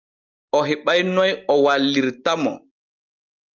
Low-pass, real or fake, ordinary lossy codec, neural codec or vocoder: 7.2 kHz; real; Opus, 24 kbps; none